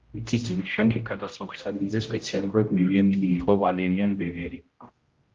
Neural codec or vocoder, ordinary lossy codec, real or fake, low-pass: codec, 16 kHz, 0.5 kbps, X-Codec, HuBERT features, trained on general audio; Opus, 32 kbps; fake; 7.2 kHz